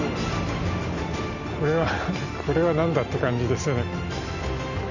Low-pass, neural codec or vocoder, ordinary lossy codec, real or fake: 7.2 kHz; none; none; real